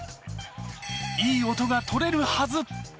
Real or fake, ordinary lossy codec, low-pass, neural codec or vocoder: real; none; none; none